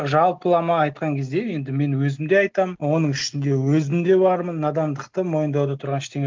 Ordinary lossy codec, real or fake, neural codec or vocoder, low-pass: Opus, 32 kbps; real; none; 7.2 kHz